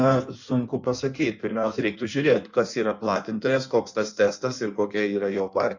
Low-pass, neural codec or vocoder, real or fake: 7.2 kHz; codec, 16 kHz in and 24 kHz out, 1.1 kbps, FireRedTTS-2 codec; fake